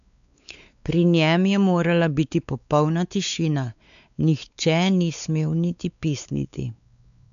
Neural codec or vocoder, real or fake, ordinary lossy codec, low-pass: codec, 16 kHz, 4 kbps, X-Codec, WavLM features, trained on Multilingual LibriSpeech; fake; none; 7.2 kHz